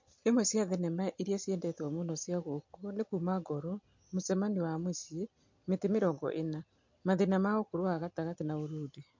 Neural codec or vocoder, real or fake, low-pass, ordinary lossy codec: none; real; 7.2 kHz; MP3, 48 kbps